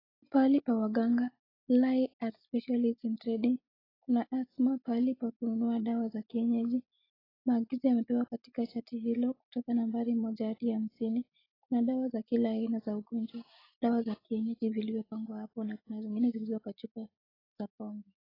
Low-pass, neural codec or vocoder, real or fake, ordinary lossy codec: 5.4 kHz; none; real; AAC, 24 kbps